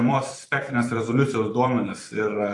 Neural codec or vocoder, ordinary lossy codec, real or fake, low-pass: vocoder, 44.1 kHz, 128 mel bands every 512 samples, BigVGAN v2; AAC, 48 kbps; fake; 10.8 kHz